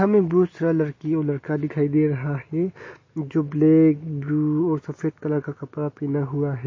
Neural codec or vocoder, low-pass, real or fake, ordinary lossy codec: none; 7.2 kHz; real; MP3, 32 kbps